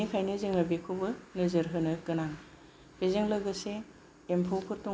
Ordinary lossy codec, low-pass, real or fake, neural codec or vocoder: none; none; real; none